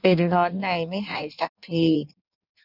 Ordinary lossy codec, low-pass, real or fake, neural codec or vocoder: none; 5.4 kHz; fake; codec, 16 kHz in and 24 kHz out, 1.1 kbps, FireRedTTS-2 codec